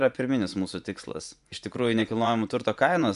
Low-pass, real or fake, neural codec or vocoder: 10.8 kHz; fake; vocoder, 24 kHz, 100 mel bands, Vocos